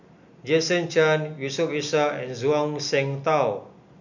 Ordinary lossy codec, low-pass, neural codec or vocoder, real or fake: none; 7.2 kHz; none; real